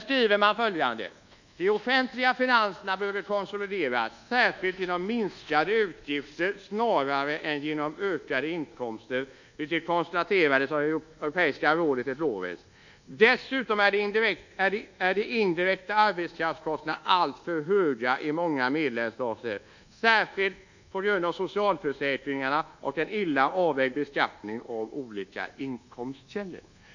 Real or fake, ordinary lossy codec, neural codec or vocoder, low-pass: fake; none; codec, 24 kHz, 1.2 kbps, DualCodec; 7.2 kHz